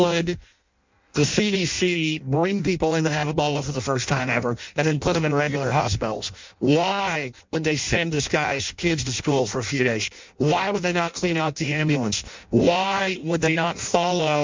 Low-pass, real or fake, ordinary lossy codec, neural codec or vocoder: 7.2 kHz; fake; MP3, 64 kbps; codec, 16 kHz in and 24 kHz out, 0.6 kbps, FireRedTTS-2 codec